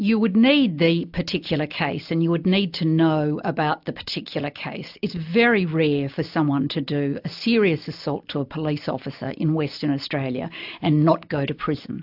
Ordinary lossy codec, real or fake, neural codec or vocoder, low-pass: AAC, 48 kbps; real; none; 5.4 kHz